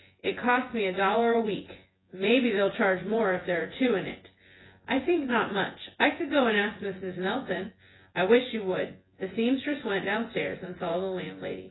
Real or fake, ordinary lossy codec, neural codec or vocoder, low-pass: fake; AAC, 16 kbps; vocoder, 24 kHz, 100 mel bands, Vocos; 7.2 kHz